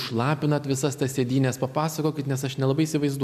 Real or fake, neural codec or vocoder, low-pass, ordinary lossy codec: real; none; 14.4 kHz; MP3, 96 kbps